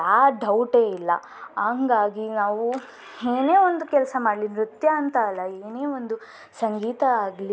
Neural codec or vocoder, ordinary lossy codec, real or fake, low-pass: none; none; real; none